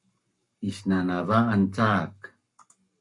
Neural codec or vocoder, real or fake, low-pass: codec, 44.1 kHz, 7.8 kbps, Pupu-Codec; fake; 10.8 kHz